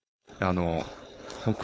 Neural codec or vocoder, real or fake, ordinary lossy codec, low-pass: codec, 16 kHz, 4.8 kbps, FACodec; fake; none; none